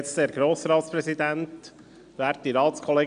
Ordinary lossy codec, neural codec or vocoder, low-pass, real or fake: none; none; 9.9 kHz; real